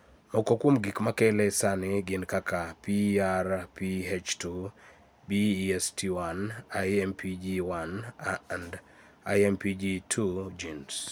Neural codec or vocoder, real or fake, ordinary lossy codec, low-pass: none; real; none; none